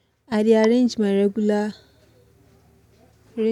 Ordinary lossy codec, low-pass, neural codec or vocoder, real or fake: none; 19.8 kHz; none; real